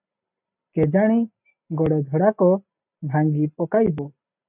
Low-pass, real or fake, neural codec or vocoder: 3.6 kHz; real; none